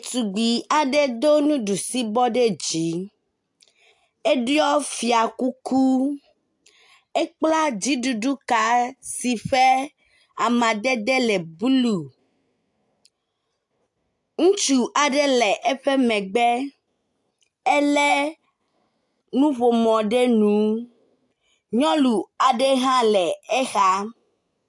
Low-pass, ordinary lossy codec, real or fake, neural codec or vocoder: 10.8 kHz; AAC, 64 kbps; real; none